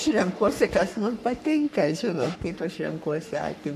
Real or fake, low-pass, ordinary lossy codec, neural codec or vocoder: fake; 14.4 kHz; MP3, 96 kbps; codec, 44.1 kHz, 3.4 kbps, Pupu-Codec